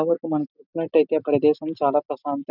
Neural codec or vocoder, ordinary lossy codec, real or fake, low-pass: none; none; real; 5.4 kHz